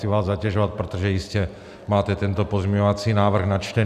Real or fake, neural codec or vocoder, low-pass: real; none; 14.4 kHz